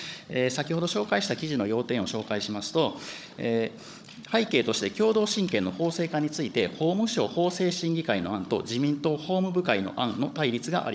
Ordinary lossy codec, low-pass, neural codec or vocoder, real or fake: none; none; codec, 16 kHz, 16 kbps, FunCodec, trained on Chinese and English, 50 frames a second; fake